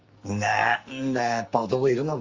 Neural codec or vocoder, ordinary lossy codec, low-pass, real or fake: codec, 44.1 kHz, 2.6 kbps, SNAC; Opus, 32 kbps; 7.2 kHz; fake